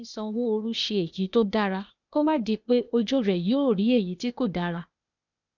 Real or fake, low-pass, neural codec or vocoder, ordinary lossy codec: fake; 7.2 kHz; codec, 16 kHz, 0.8 kbps, ZipCodec; none